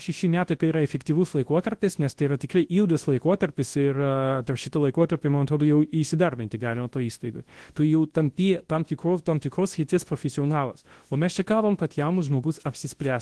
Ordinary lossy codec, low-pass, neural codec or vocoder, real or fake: Opus, 16 kbps; 10.8 kHz; codec, 24 kHz, 0.9 kbps, WavTokenizer, large speech release; fake